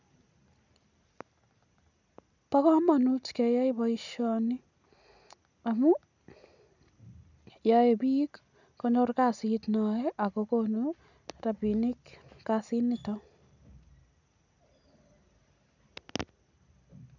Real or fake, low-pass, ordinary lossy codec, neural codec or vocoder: real; 7.2 kHz; none; none